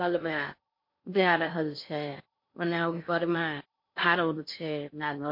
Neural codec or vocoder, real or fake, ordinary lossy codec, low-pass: codec, 16 kHz in and 24 kHz out, 0.6 kbps, FocalCodec, streaming, 2048 codes; fake; MP3, 32 kbps; 5.4 kHz